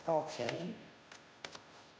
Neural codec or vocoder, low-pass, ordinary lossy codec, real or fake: codec, 16 kHz, 0.5 kbps, FunCodec, trained on Chinese and English, 25 frames a second; none; none; fake